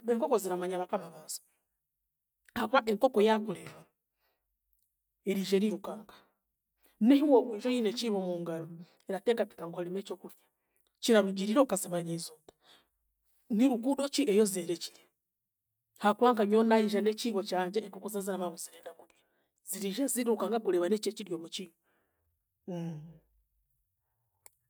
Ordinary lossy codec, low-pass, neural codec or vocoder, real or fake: none; none; none; real